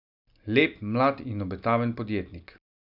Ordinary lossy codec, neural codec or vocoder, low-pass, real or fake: none; none; 5.4 kHz; real